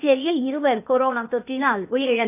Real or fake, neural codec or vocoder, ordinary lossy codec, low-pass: fake; codec, 16 kHz, 0.8 kbps, ZipCodec; none; 3.6 kHz